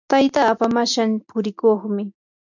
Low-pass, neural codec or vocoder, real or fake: 7.2 kHz; none; real